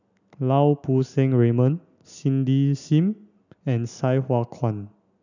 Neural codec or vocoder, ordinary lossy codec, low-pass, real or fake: none; none; 7.2 kHz; real